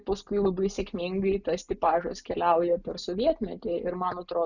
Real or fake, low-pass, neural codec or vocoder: real; 7.2 kHz; none